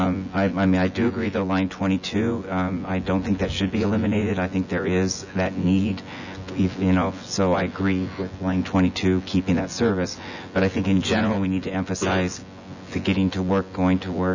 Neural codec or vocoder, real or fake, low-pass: vocoder, 24 kHz, 100 mel bands, Vocos; fake; 7.2 kHz